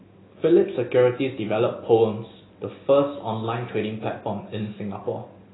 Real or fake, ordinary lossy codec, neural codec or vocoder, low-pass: real; AAC, 16 kbps; none; 7.2 kHz